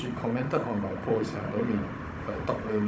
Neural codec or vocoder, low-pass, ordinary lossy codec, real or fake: codec, 16 kHz, 16 kbps, FunCodec, trained on Chinese and English, 50 frames a second; none; none; fake